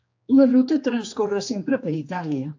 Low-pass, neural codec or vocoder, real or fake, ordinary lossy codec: 7.2 kHz; codec, 16 kHz, 2 kbps, X-Codec, HuBERT features, trained on general audio; fake; AAC, 48 kbps